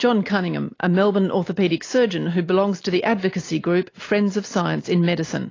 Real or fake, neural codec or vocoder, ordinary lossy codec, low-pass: real; none; AAC, 32 kbps; 7.2 kHz